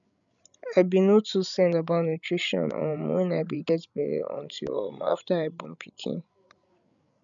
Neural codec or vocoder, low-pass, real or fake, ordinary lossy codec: codec, 16 kHz, 16 kbps, FreqCodec, larger model; 7.2 kHz; fake; none